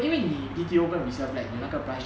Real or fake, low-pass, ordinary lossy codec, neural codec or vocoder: real; none; none; none